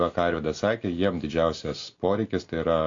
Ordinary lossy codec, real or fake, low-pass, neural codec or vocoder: AAC, 48 kbps; real; 7.2 kHz; none